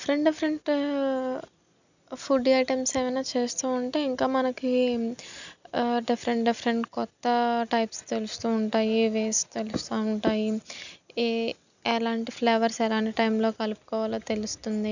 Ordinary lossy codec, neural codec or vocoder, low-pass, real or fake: none; none; 7.2 kHz; real